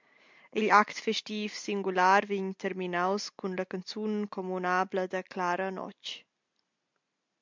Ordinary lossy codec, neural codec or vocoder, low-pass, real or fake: MP3, 64 kbps; none; 7.2 kHz; real